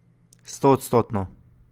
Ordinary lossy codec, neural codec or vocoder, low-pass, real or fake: Opus, 24 kbps; none; 19.8 kHz; real